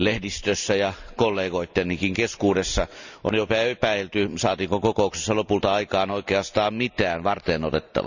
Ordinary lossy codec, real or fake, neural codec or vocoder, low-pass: none; real; none; 7.2 kHz